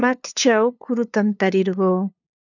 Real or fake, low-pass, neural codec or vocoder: fake; 7.2 kHz; codec, 16 kHz, 2 kbps, FunCodec, trained on LibriTTS, 25 frames a second